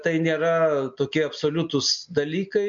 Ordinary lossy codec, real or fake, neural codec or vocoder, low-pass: MP3, 48 kbps; real; none; 7.2 kHz